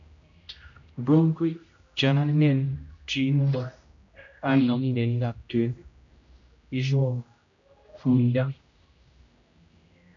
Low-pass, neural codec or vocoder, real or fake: 7.2 kHz; codec, 16 kHz, 0.5 kbps, X-Codec, HuBERT features, trained on general audio; fake